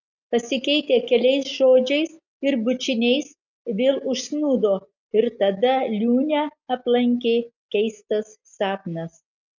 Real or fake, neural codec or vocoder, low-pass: real; none; 7.2 kHz